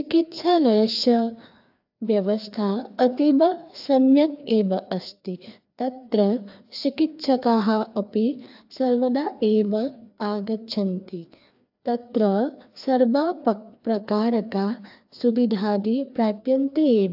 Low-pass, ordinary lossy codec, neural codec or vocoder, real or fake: 5.4 kHz; AAC, 48 kbps; codec, 16 kHz, 2 kbps, FreqCodec, larger model; fake